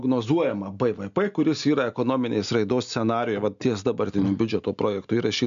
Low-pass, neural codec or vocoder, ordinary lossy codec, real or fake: 7.2 kHz; none; AAC, 96 kbps; real